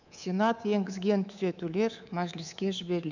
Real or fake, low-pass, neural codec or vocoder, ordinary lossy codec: fake; 7.2 kHz; codec, 24 kHz, 3.1 kbps, DualCodec; none